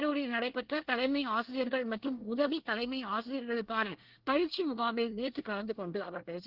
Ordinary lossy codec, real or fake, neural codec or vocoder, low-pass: Opus, 16 kbps; fake; codec, 24 kHz, 1 kbps, SNAC; 5.4 kHz